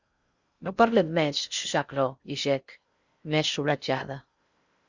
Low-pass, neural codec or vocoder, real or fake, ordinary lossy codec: 7.2 kHz; codec, 16 kHz in and 24 kHz out, 0.6 kbps, FocalCodec, streaming, 4096 codes; fake; Opus, 64 kbps